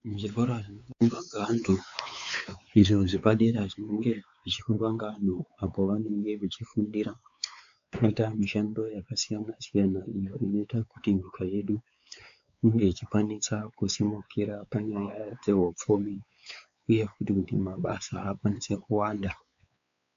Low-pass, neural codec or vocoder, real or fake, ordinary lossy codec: 7.2 kHz; codec, 16 kHz, 4 kbps, X-Codec, WavLM features, trained on Multilingual LibriSpeech; fake; AAC, 64 kbps